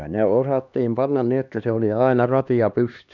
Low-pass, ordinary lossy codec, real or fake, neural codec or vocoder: 7.2 kHz; none; fake; codec, 16 kHz, 2 kbps, X-Codec, HuBERT features, trained on LibriSpeech